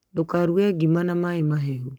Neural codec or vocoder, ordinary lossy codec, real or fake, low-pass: codec, 44.1 kHz, 7.8 kbps, Pupu-Codec; none; fake; none